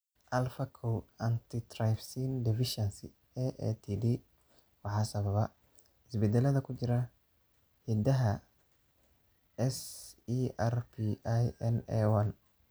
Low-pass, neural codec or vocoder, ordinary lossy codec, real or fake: none; none; none; real